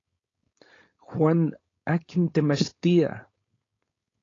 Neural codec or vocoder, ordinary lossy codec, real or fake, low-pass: codec, 16 kHz, 4.8 kbps, FACodec; AAC, 32 kbps; fake; 7.2 kHz